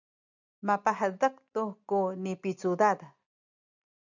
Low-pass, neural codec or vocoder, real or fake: 7.2 kHz; none; real